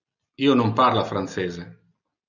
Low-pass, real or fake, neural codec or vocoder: 7.2 kHz; real; none